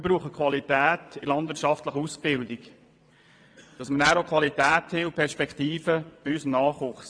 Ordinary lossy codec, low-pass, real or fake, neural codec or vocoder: AAC, 64 kbps; 9.9 kHz; fake; vocoder, 22.05 kHz, 80 mel bands, WaveNeXt